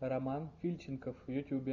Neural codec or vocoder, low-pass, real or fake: none; 7.2 kHz; real